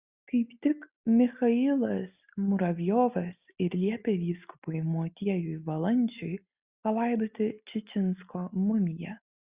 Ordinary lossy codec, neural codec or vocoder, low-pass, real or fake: Opus, 64 kbps; none; 3.6 kHz; real